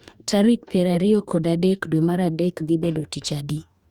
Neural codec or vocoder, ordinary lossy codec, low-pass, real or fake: codec, 44.1 kHz, 2.6 kbps, DAC; Opus, 64 kbps; 19.8 kHz; fake